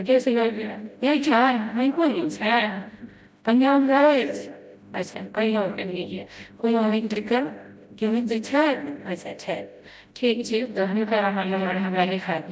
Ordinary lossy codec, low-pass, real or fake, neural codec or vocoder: none; none; fake; codec, 16 kHz, 0.5 kbps, FreqCodec, smaller model